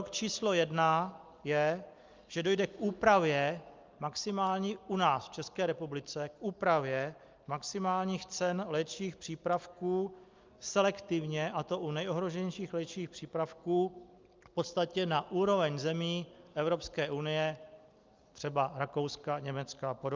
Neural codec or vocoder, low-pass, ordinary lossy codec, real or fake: none; 7.2 kHz; Opus, 24 kbps; real